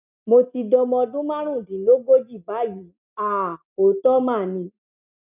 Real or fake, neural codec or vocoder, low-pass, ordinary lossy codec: real; none; 3.6 kHz; none